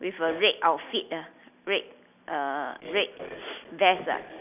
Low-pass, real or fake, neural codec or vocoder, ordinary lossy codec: 3.6 kHz; real; none; none